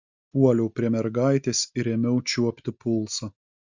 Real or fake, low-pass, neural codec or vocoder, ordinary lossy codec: real; 7.2 kHz; none; Opus, 64 kbps